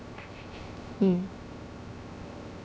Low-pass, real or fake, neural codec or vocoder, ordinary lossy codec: none; fake; codec, 16 kHz, 0.3 kbps, FocalCodec; none